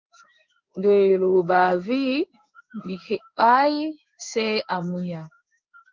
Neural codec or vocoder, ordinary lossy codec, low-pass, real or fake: codec, 16 kHz in and 24 kHz out, 1 kbps, XY-Tokenizer; Opus, 32 kbps; 7.2 kHz; fake